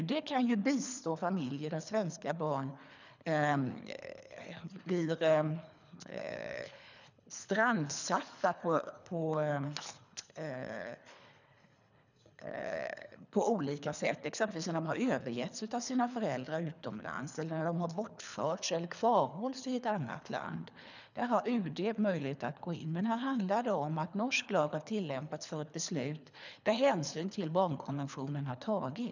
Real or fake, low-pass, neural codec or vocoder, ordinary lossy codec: fake; 7.2 kHz; codec, 24 kHz, 3 kbps, HILCodec; none